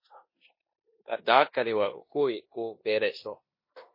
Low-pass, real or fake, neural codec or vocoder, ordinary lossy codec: 5.4 kHz; fake; codec, 16 kHz in and 24 kHz out, 0.9 kbps, LongCat-Audio-Codec, four codebook decoder; MP3, 24 kbps